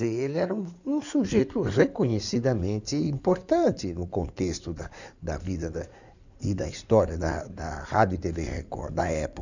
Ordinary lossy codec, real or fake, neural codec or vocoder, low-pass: none; fake; codec, 16 kHz in and 24 kHz out, 2.2 kbps, FireRedTTS-2 codec; 7.2 kHz